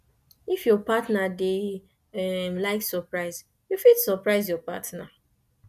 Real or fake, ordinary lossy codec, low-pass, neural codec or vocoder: real; none; 14.4 kHz; none